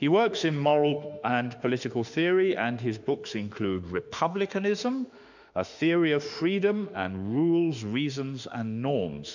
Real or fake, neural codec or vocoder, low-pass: fake; autoencoder, 48 kHz, 32 numbers a frame, DAC-VAE, trained on Japanese speech; 7.2 kHz